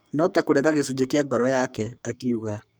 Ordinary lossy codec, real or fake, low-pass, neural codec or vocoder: none; fake; none; codec, 44.1 kHz, 2.6 kbps, SNAC